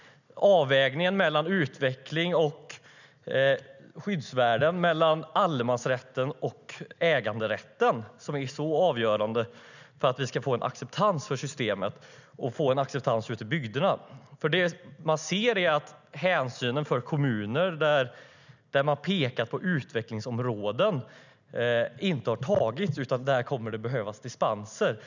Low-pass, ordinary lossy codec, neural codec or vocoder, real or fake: 7.2 kHz; none; none; real